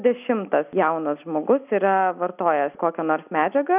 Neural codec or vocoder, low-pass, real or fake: none; 3.6 kHz; real